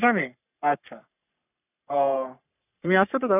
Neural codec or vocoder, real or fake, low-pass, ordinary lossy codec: codec, 44.1 kHz, 3.4 kbps, Pupu-Codec; fake; 3.6 kHz; none